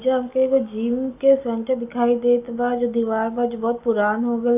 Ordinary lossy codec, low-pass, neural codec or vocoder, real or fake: none; 3.6 kHz; none; real